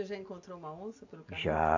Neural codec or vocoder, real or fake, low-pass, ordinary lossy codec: none; real; 7.2 kHz; AAC, 48 kbps